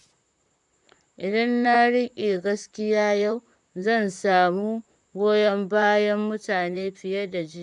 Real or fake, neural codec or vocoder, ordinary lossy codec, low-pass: fake; vocoder, 44.1 kHz, 128 mel bands, Pupu-Vocoder; none; 10.8 kHz